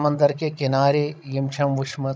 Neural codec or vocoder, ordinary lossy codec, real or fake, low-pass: codec, 16 kHz, 16 kbps, FreqCodec, larger model; none; fake; none